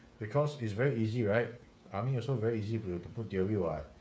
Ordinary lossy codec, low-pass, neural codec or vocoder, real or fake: none; none; codec, 16 kHz, 8 kbps, FreqCodec, smaller model; fake